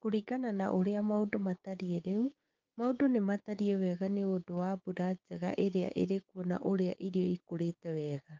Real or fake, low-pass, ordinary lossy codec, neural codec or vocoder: fake; 7.2 kHz; Opus, 24 kbps; codec, 16 kHz, 6 kbps, DAC